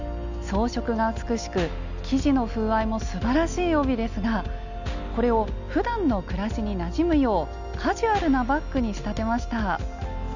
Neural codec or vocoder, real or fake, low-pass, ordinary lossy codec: none; real; 7.2 kHz; none